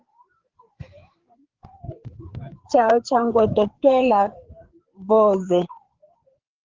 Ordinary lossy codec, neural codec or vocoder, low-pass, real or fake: Opus, 16 kbps; codec, 16 kHz, 4 kbps, FreqCodec, larger model; 7.2 kHz; fake